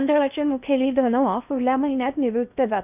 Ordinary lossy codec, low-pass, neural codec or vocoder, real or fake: none; 3.6 kHz; codec, 16 kHz in and 24 kHz out, 0.8 kbps, FocalCodec, streaming, 65536 codes; fake